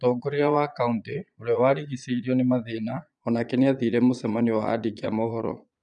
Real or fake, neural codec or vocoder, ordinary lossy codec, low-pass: fake; vocoder, 22.05 kHz, 80 mel bands, Vocos; none; 9.9 kHz